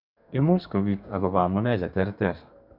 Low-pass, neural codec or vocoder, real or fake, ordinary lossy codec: 5.4 kHz; codec, 32 kHz, 1.9 kbps, SNAC; fake; none